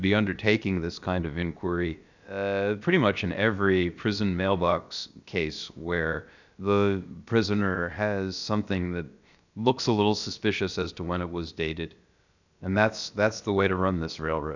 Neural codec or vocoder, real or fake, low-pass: codec, 16 kHz, about 1 kbps, DyCAST, with the encoder's durations; fake; 7.2 kHz